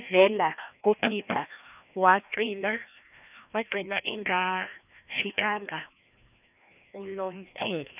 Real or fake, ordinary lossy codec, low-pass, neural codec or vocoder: fake; none; 3.6 kHz; codec, 16 kHz, 1 kbps, FreqCodec, larger model